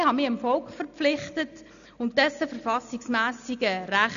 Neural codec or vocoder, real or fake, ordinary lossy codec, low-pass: none; real; none; 7.2 kHz